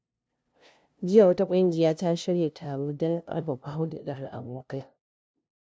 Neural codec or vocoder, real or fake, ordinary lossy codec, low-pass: codec, 16 kHz, 0.5 kbps, FunCodec, trained on LibriTTS, 25 frames a second; fake; none; none